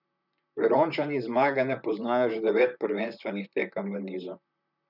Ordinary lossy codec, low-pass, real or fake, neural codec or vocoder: none; 5.4 kHz; fake; vocoder, 44.1 kHz, 128 mel bands, Pupu-Vocoder